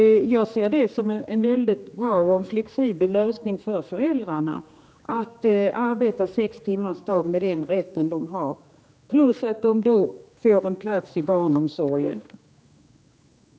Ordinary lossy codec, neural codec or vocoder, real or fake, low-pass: none; codec, 16 kHz, 2 kbps, X-Codec, HuBERT features, trained on general audio; fake; none